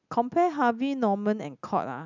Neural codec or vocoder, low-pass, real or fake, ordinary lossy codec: none; 7.2 kHz; real; none